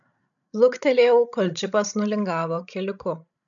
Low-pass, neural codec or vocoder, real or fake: 7.2 kHz; codec, 16 kHz, 16 kbps, FreqCodec, larger model; fake